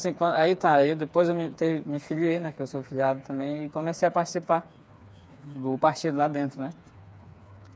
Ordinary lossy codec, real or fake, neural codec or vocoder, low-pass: none; fake; codec, 16 kHz, 4 kbps, FreqCodec, smaller model; none